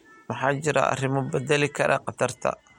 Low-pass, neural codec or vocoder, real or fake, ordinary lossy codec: 19.8 kHz; none; real; MP3, 64 kbps